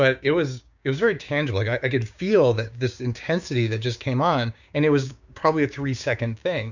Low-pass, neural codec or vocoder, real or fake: 7.2 kHz; codec, 16 kHz, 6 kbps, DAC; fake